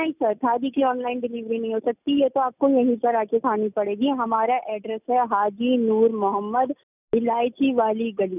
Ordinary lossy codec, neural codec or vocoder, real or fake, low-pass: none; none; real; 3.6 kHz